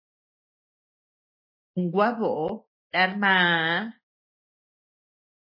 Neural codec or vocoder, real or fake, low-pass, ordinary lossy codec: none; real; 5.4 kHz; MP3, 24 kbps